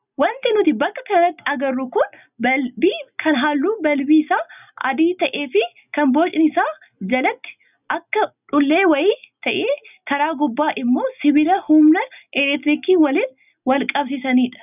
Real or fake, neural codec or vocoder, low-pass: real; none; 3.6 kHz